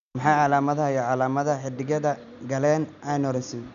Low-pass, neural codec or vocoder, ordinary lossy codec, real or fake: 7.2 kHz; none; none; real